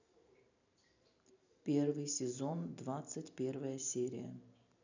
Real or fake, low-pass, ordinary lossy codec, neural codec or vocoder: fake; 7.2 kHz; none; vocoder, 44.1 kHz, 128 mel bands every 512 samples, BigVGAN v2